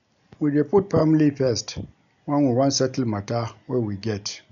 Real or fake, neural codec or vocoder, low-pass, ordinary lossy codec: real; none; 7.2 kHz; MP3, 96 kbps